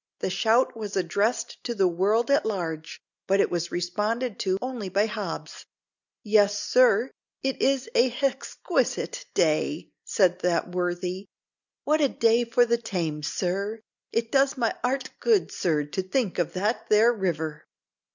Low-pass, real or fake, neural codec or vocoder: 7.2 kHz; real; none